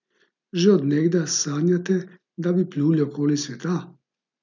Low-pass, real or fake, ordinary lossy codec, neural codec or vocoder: 7.2 kHz; real; none; none